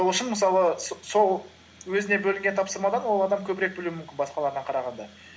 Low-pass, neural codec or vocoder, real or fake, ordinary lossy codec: none; none; real; none